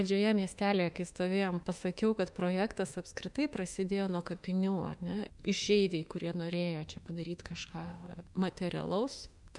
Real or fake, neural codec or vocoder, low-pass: fake; autoencoder, 48 kHz, 32 numbers a frame, DAC-VAE, trained on Japanese speech; 10.8 kHz